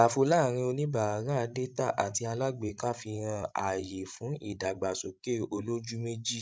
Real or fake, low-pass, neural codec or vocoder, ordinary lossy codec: fake; none; codec, 16 kHz, 16 kbps, FreqCodec, larger model; none